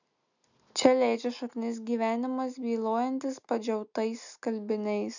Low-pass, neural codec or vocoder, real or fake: 7.2 kHz; none; real